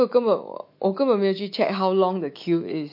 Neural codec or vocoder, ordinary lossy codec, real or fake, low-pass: autoencoder, 48 kHz, 128 numbers a frame, DAC-VAE, trained on Japanese speech; MP3, 32 kbps; fake; 5.4 kHz